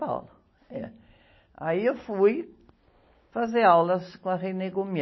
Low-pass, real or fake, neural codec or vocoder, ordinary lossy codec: 7.2 kHz; real; none; MP3, 24 kbps